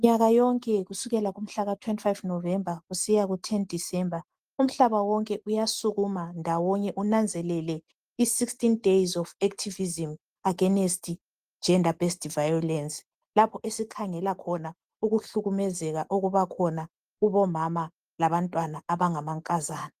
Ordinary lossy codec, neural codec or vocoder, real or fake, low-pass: Opus, 24 kbps; none; real; 14.4 kHz